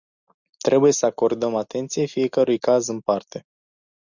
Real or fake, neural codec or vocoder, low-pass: real; none; 7.2 kHz